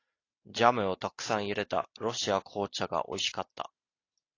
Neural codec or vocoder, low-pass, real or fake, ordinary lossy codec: none; 7.2 kHz; real; AAC, 32 kbps